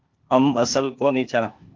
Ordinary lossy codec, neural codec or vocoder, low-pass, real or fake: Opus, 32 kbps; codec, 16 kHz, 0.8 kbps, ZipCodec; 7.2 kHz; fake